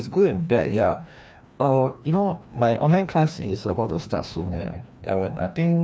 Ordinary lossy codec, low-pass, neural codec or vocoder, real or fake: none; none; codec, 16 kHz, 1 kbps, FreqCodec, larger model; fake